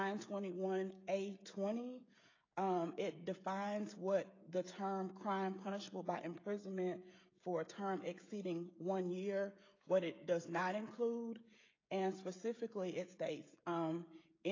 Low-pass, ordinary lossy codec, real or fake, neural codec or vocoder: 7.2 kHz; AAC, 32 kbps; fake; codec, 16 kHz, 16 kbps, FreqCodec, smaller model